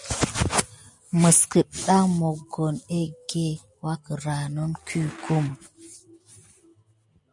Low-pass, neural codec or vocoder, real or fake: 10.8 kHz; none; real